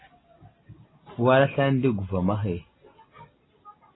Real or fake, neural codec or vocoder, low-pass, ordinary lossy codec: real; none; 7.2 kHz; AAC, 16 kbps